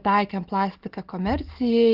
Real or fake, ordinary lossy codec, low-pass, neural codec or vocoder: real; Opus, 16 kbps; 5.4 kHz; none